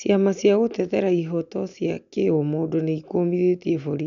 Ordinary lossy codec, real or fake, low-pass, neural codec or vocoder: none; real; 7.2 kHz; none